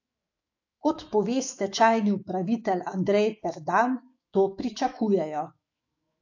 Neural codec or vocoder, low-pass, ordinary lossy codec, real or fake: autoencoder, 48 kHz, 128 numbers a frame, DAC-VAE, trained on Japanese speech; 7.2 kHz; AAC, 48 kbps; fake